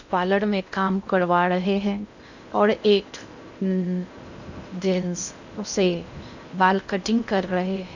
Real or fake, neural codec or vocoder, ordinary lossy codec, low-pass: fake; codec, 16 kHz in and 24 kHz out, 0.6 kbps, FocalCodec, streaming, 2048 codes; none; 7.2 kHz